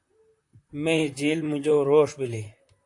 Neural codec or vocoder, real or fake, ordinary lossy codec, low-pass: vocoder, 44.1 kHz, 128 mel bands, Pupu-Vocoder; fake; AAC, 64 kbps; 10.8 kHz